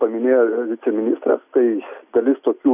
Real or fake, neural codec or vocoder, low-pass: real; none; 3.6 kHz